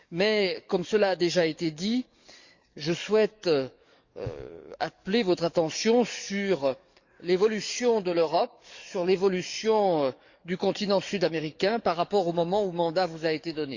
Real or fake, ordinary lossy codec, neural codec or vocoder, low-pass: fake; Opus, 64 kbps; codec, 44.1 kHz, 7.8 kbps, DAC; 7.2 kHz